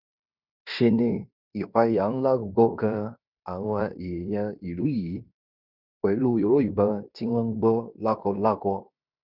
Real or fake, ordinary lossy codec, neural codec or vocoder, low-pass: fake; none; codec, 16 kHz in and 24 kHz out, 0.9 kbps, LongCat-Audio-Codec, fine tuned four codebook decoder; 5.4 kHz